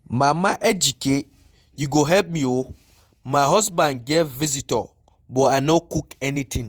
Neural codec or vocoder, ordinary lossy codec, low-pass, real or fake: vocoder, 48 kHz, 128 mel bands, Vocos; Opus, 32 kbps; 19.8 kHz; fake